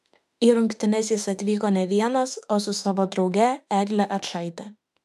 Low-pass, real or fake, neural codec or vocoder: 14.4 kHz; fake; autoencoder, 48 kHz, 32 numbers a frame, DAC-VAE, trained on Japanese speech